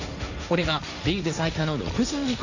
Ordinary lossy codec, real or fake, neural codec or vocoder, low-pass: none; fake; codec, 16 kHz, 1.1 kbps, Voila-Tokenizer; 7.2 kHz